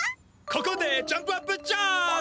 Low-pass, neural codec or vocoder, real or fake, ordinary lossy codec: none; none; real; none